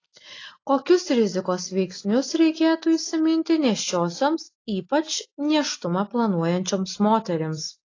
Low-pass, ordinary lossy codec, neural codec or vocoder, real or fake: 7.2 kHz; AAC, 32 kbps; none; real